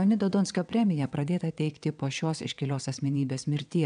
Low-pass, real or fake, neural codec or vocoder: 9.9 kHz; fake; vocoder, 22.05 kHz, 80 mel bands, WaveNeXt